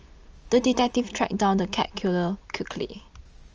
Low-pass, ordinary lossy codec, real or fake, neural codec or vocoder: 7.2 kHz; Opus, 24 kbps; real; none